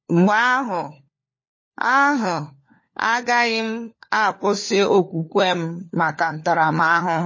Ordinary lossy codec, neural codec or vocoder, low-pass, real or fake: MP3, 32 kbps; codec, 16 kHz, 4 kbps, FunCodec, trained on LibriTTS, 50 frames a second; 7.2 kHz; fake